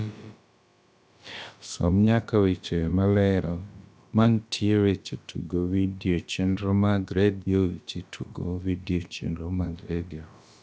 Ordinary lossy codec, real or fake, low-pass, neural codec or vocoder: none; fake; none; codec, 16 kHz, about 1 kbps, DyCAST, with the encoder's durations